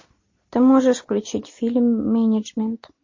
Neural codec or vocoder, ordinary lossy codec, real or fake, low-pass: none; MP3, 32 kbps; real; 7.2 kHz